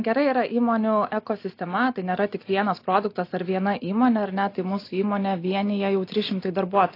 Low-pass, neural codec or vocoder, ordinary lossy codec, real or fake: 5.4 kHz; none; AAC, 32 kbps; real